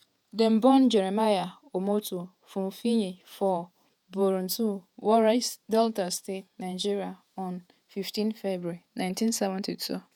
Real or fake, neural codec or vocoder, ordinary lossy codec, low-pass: fake; vocoder, 48 kHz, 128 mel bands, Vocos; none; 19.8 kHz